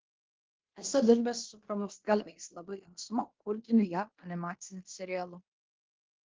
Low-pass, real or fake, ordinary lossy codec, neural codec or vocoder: 7.2 kHz; fake; Opus, 16 kbps; codec, 16 kHz in and 24 kHz out, 0.9 kbps, LongCat-Audio-Codec, fine tuned four codebook decoder